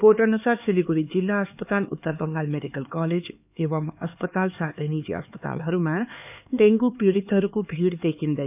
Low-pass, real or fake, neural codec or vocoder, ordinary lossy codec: 3.6 kHz; fake; codec, 16 kHz, 4 kbps, X-Codec, HuBERT features, trained on LibriSpeech; none